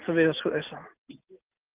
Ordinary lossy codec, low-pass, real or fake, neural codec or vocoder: Opus, 32 kbps; 3.6 kHz; real; none